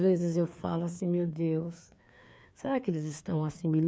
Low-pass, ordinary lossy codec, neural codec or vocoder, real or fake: none; none; codec, 16 kHz, 2 kbps, FreqCodec, larger model; fake